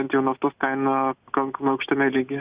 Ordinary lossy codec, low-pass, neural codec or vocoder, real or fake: Opus, 64 kbps; 3.6 kHz; none; real